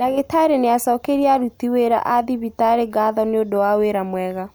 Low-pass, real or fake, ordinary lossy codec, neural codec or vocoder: none; real; none; none